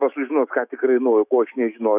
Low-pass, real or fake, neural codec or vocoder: 3.6 kHz; fake; autoencoder, 48 kHz, 128 numbers a frame, DAC-VAE, trained on Japanese speech